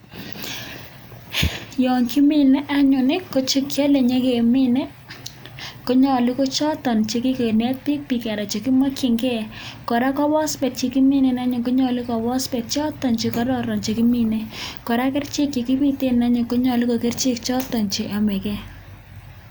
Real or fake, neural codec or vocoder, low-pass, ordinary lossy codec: real; none; none; none